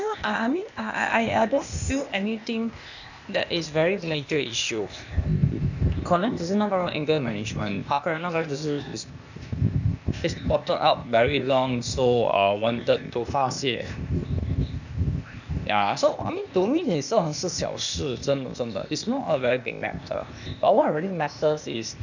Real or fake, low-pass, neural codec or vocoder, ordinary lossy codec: fake; 7.2 kHz; codec, 16 kHz, 0.8 kbps, ZipCodec; none